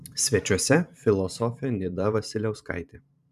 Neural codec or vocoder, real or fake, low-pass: vocoder, 44.1 kHz, 128 mel bands every 512 samples, BigVGAN v2; fake; 14.4 kHz